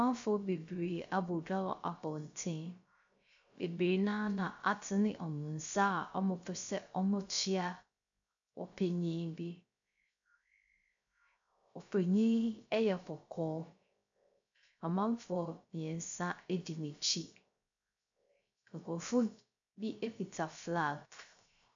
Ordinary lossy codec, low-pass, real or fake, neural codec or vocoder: AAC, 64 kbps; 7.2 kHz; fake; codec, 16 kHz, 0.3 kbps, FocalCodec